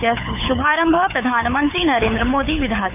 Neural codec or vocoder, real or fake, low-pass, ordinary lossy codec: codec, 24 kHz, 6 kbps, HILCodec; fake; 3.6 kHz; none